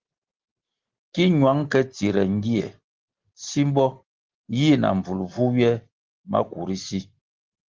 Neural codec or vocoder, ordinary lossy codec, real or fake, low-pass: none; Opus, 16 kbps; real; 7.2 kHz